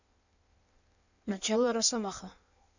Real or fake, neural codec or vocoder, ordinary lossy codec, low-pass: fake; codec, 16 kHz in and 24 kHz out, 1.1 kbps, FireRedTTS-2 codec; none; 7.2 kHz